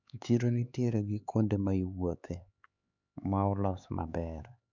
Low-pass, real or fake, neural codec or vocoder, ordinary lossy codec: 7.2 kHz; fake; codec, 16 kHz, 4 kbps, X-Codec, HuBERT features, trained on LibriSpeech; none